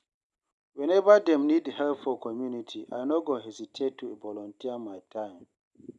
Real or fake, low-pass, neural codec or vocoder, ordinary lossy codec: real; 10.8 kHz; none; none